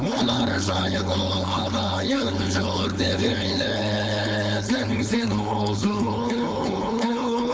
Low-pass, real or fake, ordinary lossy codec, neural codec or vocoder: none; fake; none; codec, 16 kHz, 4.8 kbps, FACodec